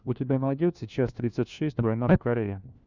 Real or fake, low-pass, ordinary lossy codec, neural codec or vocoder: fake; 7.2 kHz; Opus, 64 kbps; codec, 16 kHz, 1 kbps, FunCodec, trained on LibriTTS, 50 frames a second